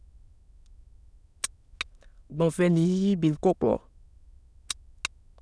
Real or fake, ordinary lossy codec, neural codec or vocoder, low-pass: fake; none; autoencoder, 22.05 kHz, a latent of 192 numbers a frame, VITS, trained on many speakers; none